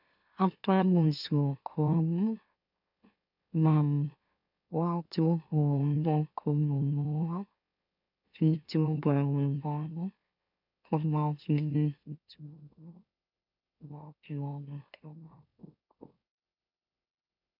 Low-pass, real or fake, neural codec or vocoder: 5.4 kHz; fake; autoencoder, 44.1 kHz, a latent of 192 numbers a frame, MeloTTS